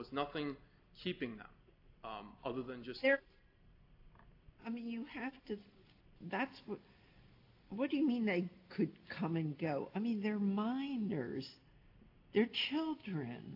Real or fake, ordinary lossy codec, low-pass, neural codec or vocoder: real; AAC, 32 kbps; 5.4 kHz; none